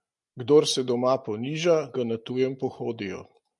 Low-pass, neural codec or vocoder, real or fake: 10.8 kHz; none; real